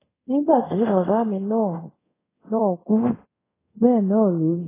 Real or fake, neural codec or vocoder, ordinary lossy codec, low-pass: fake; codec, 24 kHz, 0.5 kbps, DualCodec; AAC, 16 kbps; 3.6 kHz